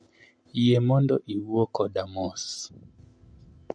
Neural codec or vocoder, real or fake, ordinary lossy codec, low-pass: none; real; MP3, 48 kbps; 9.9 kHz